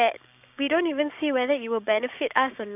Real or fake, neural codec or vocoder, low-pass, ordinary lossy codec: fake; codec, 16 kHz, 6 kbps, DAC; 3.6 kHz; none